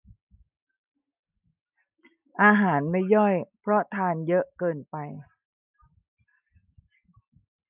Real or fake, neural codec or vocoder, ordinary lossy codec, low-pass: real; none; none; 3.6 kHz